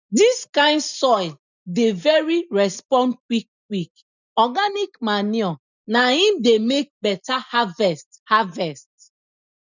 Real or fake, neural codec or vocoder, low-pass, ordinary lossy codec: real; none; 7.2 kHz; none